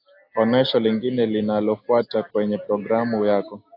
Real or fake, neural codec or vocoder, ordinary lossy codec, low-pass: real; none; AAC, 32 kbps; 5.4 kHz